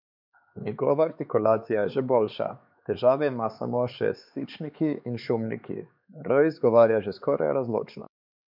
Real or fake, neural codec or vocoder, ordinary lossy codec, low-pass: fake; codec, 16 kHz, 4 kbps, X-Codec, HuBERT features, trained on LibriSpeech; MP3, 48 kbps; 5.4 kHz